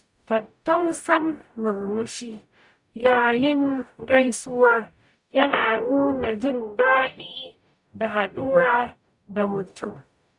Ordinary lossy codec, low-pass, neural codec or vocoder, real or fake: MP3, 96 kbps; 10.8 kHz; codec, 44.1 kHz, 0.9 kbps, DAC; fake